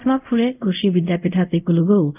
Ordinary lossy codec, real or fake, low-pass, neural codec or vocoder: none; fake; 3.6 kHz; codec, 24 kHz, 0.5 kbps, DualCodec